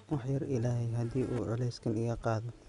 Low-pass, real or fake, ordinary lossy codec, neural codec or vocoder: 10.8 kHz; real; none; none